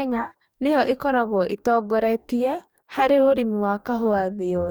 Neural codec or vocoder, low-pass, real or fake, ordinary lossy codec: codec, 44.1 kHz, 2.6 kbps, DAC; none; fake; none